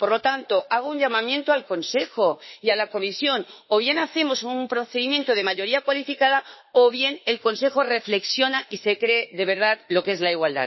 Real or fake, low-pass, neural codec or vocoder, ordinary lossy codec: fake; 7.2 kHz; autoencoder, 48 kHz, 32 numbers a frame, DAC-VAE, trained on Japanese speech; MP3, 24 kbps